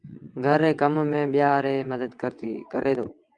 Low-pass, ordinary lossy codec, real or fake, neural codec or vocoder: 9.9 kHz; Opus, 32 kbps; fake; vocoder, 22.05 kHz, 80 mel bands, WaveNeXt